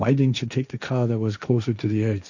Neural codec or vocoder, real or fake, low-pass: codec, 16 kHz, 1.1 kbps, Voila-Tokenizer; fake; 7.2 kHz